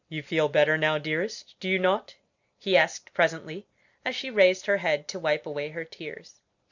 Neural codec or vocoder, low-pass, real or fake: none; 7.2 kHz; real